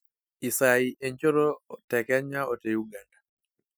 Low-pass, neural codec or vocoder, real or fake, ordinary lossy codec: none; none; real; none